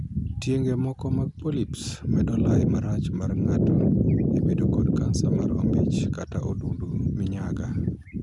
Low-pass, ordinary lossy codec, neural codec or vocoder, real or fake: 10.8 kHz; none; none; real